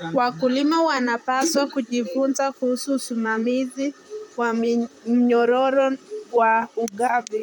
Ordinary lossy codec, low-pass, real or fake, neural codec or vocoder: none; 19.8 kHz; fake; vocoder, 44.1 kHz, 128 mel bands, Pupu-Vocoder